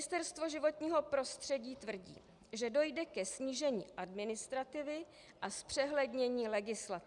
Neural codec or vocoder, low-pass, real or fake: none; 10.8 kHz; real